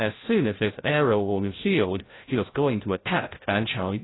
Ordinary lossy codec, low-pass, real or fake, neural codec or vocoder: AAC, 16 kbps; 7.2 kHz; fake; codec, 16 kHz, 0.5 kbps, FreqCodec, larger model